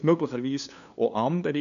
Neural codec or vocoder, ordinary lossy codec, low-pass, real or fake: codec, 16 kHz, 2 kbps, FunCodec, trained on LibriTTS, 25 frames a second; none; 7.2 kHz; fake